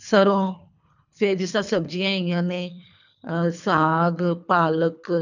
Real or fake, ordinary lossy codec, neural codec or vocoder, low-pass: fake; none; codec, 24 kHz, 3 kbps, HILCodec; 7.2 kHz